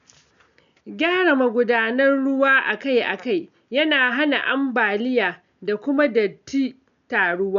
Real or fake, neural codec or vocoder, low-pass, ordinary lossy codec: real; none; 7.2 kHz; none